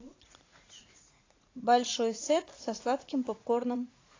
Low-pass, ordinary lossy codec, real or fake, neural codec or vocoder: 7.2 kHz; AAC, 32 kbps; fake; vocoder, 44.1 kHz, 80 mel bands, Vocos